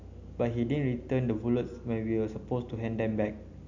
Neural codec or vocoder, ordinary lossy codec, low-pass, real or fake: none; none; 7.2 kHz; real